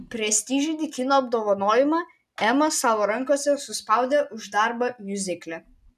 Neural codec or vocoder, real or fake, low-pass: none; real; 14.4 kHz